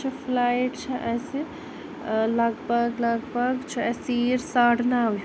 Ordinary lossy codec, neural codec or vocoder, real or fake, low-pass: none; none; real; none